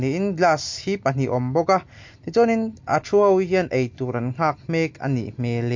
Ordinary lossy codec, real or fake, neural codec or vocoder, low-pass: MP3, 48 kbps; real; none; 7.2 kHz